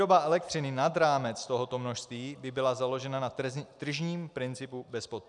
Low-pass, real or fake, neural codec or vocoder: 10.8 kHz; real; none